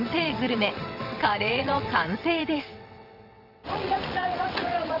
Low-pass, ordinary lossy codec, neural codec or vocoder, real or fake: 5.4 kHz; none; vocoder, 22.05 kHz, 80 mel bands, WaveNeXt; fake